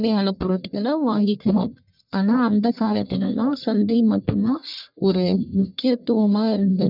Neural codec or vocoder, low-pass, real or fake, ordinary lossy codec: codec, 44.1 kHz, 1.7 kbps, Pupu-Codec; 5.4 kHz; fake; none